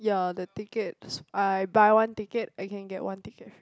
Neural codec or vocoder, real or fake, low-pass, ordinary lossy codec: none; real; none; none